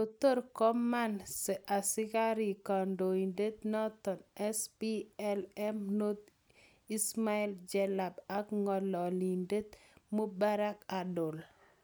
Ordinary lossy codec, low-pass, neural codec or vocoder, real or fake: none; none; none; real